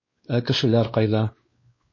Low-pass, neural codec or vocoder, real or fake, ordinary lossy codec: 7.2 kHz; codec, 16 kHz, 2 kbps, X-Codec, WavLM features, trained on Multilingual LibriSpeech; fake; MP3, 32 kbps